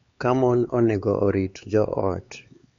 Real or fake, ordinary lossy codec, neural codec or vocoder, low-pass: fake; MP3, 48 kbps; codec, 16 kHz, 4 kbps, X-Codec, HuBERT features, trained on LibriSpeech; 7.2 kHz